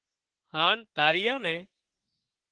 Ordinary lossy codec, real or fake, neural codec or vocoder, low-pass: Opus, 16 kbps; fake; codec, 24 kHz, 1 kbps, SNAC; 9.9 kHz